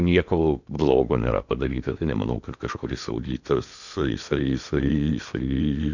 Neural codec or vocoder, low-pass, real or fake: codec, 16 kHz in and 24 kHz out, 0.8 kbps, FocalCodec, streaming, 65536 codes; 7.2 kHz; fake